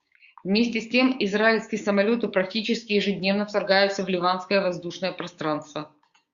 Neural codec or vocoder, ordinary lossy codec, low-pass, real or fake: codec, 16 kHz, 6 kbps, DAC; Opus, 64 kbps; 7.2 kHz; fake